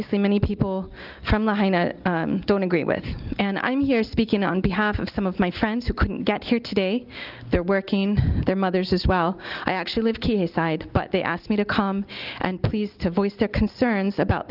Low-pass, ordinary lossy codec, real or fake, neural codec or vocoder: 5.4 kHz; Opus, 32 kbps; real; none